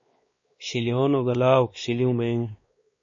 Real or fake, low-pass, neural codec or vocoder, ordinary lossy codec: fake; 7.2 kHz; codec, 16 kHz, 2 kbps, X-Codec, WavLM features, trained on Multilingual LibriSpeech; MP3, 32 kbps